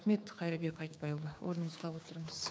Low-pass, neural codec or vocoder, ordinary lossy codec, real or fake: none; codec, 16 kHz, 6 kbps, DAC; none; fake